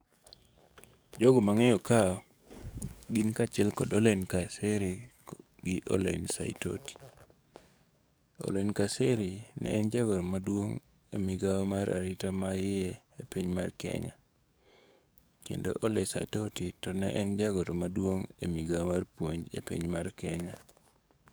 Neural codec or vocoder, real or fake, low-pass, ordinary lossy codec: codec, 44.1 kHz, 7.8 kbps, DAC; fake; none; none